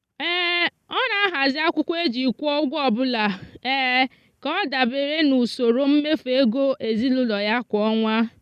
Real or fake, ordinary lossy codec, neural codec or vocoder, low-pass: real; none; none; 14.4 kHz